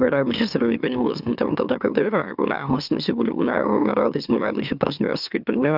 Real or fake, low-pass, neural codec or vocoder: fake; 5.4 kHz; autoencoder, 44.1 kHz, a latent of 192 numbers a frame, MeloTTS